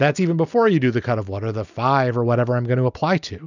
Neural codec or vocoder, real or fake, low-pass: none; real; 7.2 kHz